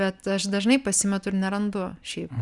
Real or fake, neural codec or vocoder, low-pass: real; none; 10.8 kHz